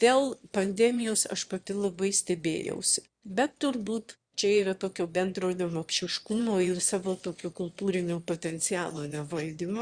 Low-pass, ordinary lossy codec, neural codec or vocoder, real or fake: 9.9 kHz; Opus, 64 kbps; autoencoder, 22.05 kHz, a latent of 192 numbers a frame, VITS, trained on one speaker; fake